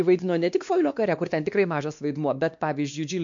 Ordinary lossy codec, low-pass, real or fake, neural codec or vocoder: MP3, 64 kbps; 7.2 kHz; fake; codec, 16 kHz, 2 kbps, X-Codec, WavLM features, trained on Multilingual LibriSpeech